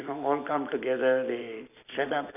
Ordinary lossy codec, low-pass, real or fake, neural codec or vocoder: none; 3.6 kHz; real; none